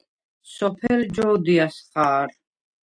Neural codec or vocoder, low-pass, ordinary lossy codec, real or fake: none; 9.9 kHz; AAC, 64 kbps; real